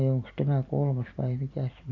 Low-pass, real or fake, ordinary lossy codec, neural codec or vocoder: 7.2 kHz; fake; AAC, 48 kbps; vocoder, 44.1 kHz, 128 mel bands every 256 samples, BigVGAN v2